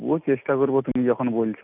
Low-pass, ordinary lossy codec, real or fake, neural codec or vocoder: 3.6 kHz; none; real; none